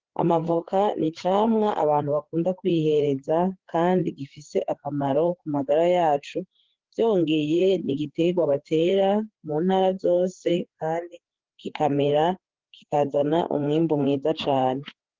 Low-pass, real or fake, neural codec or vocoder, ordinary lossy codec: 7.2 kHz; fake; codec, 16 kHz, 4 kbps, FreqCodec, larger model; Opus, 16 kbps